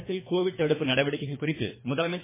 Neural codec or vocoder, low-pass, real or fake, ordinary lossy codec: codec, 24 kHz, 3 kbps, HILCodec; 3.6 kHz; fake; MP3, 16 kbps